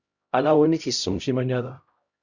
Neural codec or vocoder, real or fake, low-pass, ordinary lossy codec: codec, 16 kHz, 0.5 kbps, X-Codec, HuBERT features, trained on LibriSpeech; fake; 7.2 kHz; Opus, 64 kbps